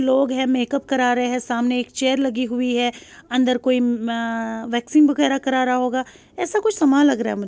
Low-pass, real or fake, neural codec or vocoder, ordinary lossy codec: none; real; none; none